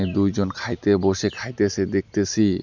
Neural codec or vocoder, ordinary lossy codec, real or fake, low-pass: none; none; real; 7.2 kHz